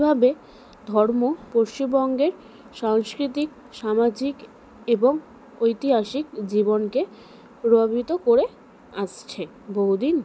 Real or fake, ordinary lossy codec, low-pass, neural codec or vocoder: real; none; none; none